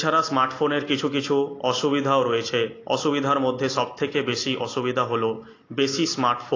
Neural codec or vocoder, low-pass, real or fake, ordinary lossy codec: none; 7.2 kHz; real; AAC, 32 kbps